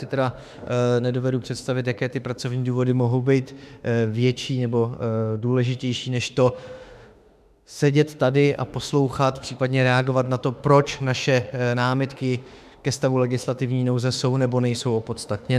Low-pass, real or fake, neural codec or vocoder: 14.4 kHz; fake; autoencoder, 48 kHz, 32 numbers a frame, DAC-VAE, trained on Japanese speech